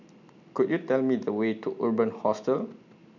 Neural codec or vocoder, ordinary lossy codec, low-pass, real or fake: none; none; 7.2 kHz; real